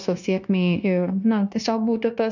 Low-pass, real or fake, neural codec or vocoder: 7.2 kHz; fake; codec, 16 kHz, 0.9 kbps, LongCat-Audio-Codec